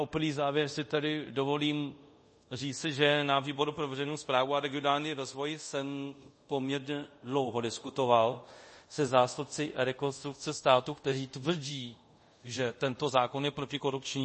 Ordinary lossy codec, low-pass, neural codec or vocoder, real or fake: MP3, 32 kbps; 10.8 kHz; codec, 24 kHz, 0.5 kbps, DualCodec; fake